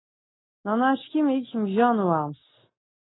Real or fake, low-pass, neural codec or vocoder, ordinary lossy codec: real; 7.2 kHz; none; AAC, 16 kbps